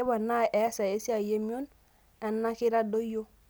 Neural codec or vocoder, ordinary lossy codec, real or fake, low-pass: none; none; real; none